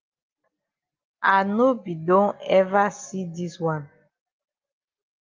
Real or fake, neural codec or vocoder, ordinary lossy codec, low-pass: real; none; Opus, 32 kbps; 7.2 kHz